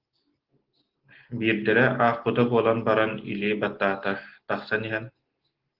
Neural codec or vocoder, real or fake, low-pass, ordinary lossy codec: none; real; 5.4 kHz; Opus, 16 kbps